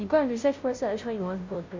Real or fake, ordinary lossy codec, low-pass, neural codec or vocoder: fake; MP3, 48 kbps; 7.2 kHz; codec, 16 kHz, 0.5 kbps, FunCodec, trained on Chinese and English, 25 frames a second